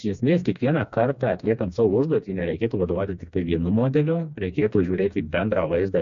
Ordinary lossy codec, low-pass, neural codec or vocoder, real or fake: MP3, 48 kbps; 7.2 kHz; codec, 16 kHz, 2 kbps, FreqCodec, smaller model; fake